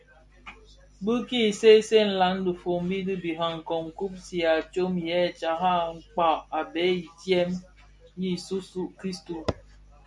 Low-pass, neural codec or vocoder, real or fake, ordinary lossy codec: 10.8 kHz; none; real; MP3, 96 kbps